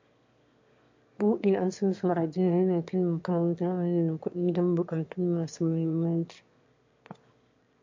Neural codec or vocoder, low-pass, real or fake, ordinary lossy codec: autoencoder, 22.05 kHz, a latent of 192 numbers a frame, VITS, trained on one speaker; 7.2 kHz; fake; MP3, 48 kbps